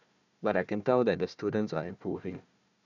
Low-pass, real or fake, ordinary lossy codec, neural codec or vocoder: 7.2 kHz; fake; none; codec, 16 kHz, 1 kbps, FunCodec, trained on Chinese and English, 50 frames a second